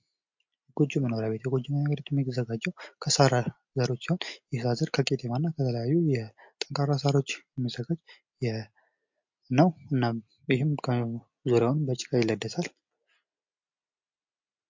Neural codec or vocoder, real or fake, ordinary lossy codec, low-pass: none; real; MP3, 48 kbps; 7.2 kHz